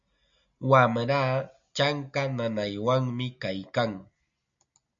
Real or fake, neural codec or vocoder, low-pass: real; none; 7.2 kHz